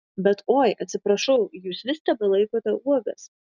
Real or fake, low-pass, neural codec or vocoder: real; 7.2 kHz; none